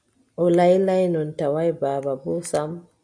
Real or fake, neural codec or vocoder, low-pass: real; none; 9.9 kHz